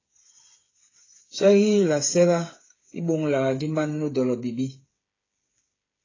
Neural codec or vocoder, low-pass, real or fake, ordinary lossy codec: codec, 16 kHz, 8 kbps, FreqCodec, smaller model; 7.2 kHz; fake; AAC, 32 kbps